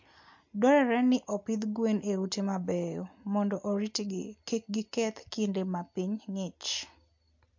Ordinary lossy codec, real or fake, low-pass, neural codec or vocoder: MP3, 48 kbps; real; 7.2 kHz; none